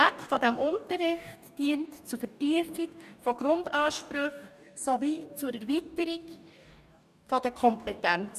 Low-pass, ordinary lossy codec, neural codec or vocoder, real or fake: 14.4 kHz; none; codec, 44.1 kHz, 2.6 kbps, DAC; fake